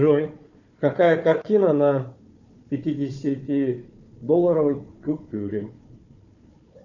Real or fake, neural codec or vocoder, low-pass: fake; codec, 16 kHz, 4 kbps, FunCodec, trained on Chinese and English, 50 frames a second; 7.2 kHz